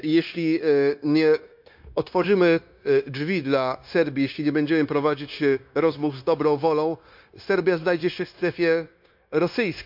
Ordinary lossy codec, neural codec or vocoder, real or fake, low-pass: MP3, 48 kbps; codec, 16 kHz, 0.9 kbps, LongCat-Audio-Codec; fake; 5.4 kHz